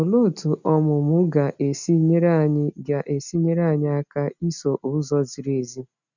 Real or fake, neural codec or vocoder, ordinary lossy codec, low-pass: real; none; none; 7.2 kHz